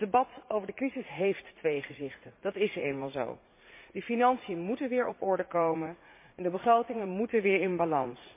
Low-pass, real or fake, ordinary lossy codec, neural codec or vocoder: 3.6 kHz; fake; MP3, 24 kbps; vocoder, 44.1 kHz, 80 mel bands, Vocos